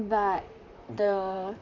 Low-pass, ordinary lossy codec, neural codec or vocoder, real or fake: 7.2 kHz; none; vocoder, 44.1 kHz, 128 mel bands, Pupu-Vocoder; fake